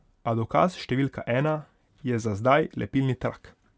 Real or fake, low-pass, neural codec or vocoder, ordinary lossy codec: real; none; none; none